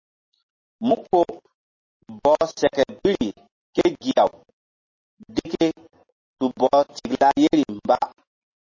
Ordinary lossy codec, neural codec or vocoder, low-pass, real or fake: MP3, 32 kbps; none; 7.2 kHz; real